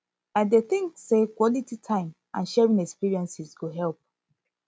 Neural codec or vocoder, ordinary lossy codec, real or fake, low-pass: none; none; real; none